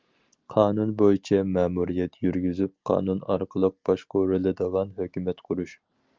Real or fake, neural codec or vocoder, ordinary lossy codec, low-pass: real; none; Opus, 24 kbps; 7.2 kHz